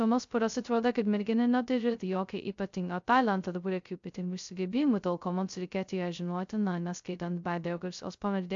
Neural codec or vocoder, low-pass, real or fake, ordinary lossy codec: codec, 16 kHz, 0.2 kbps, FocalCodec; 7.2 kHz; fake; AAC, 64 kbps